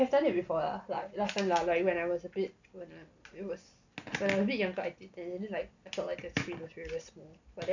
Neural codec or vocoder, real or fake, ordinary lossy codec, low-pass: none; real; none; 7.2 kHz